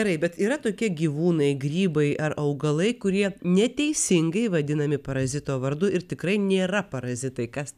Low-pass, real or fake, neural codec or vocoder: 14.4 kHz; real; none